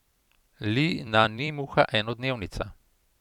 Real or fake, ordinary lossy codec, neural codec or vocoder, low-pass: fake; none; vocoder, 44.1 kHz, 128 mel bands every 256 samples, BigVGAN v2; 19.8 kHz